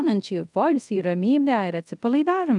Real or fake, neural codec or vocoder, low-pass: fake; codec, 24 kHz, 0.5 kbps, DualCodec; 10.8 kHz